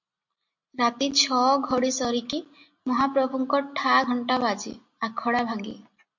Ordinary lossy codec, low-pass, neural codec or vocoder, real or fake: MP3, 48 kbps; 7.2 kHz; none; real